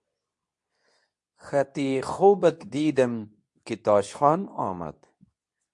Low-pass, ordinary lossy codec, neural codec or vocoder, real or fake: 10.8 kHz; MP3, 64 kbps; codec, 24 kHz, 0.9 kbps, WavTokenizer, medium speech release version 2; fake